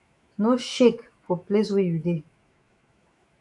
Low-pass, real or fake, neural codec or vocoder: 10.8 kHz; fake; autoencoder, 48 kHz, 128 numbers a frame, DAC-VAE, trained on Japanese speech